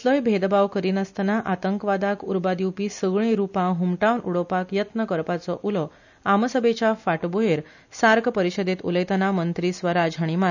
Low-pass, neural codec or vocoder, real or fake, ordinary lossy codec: 7.2 kHz; none; real; none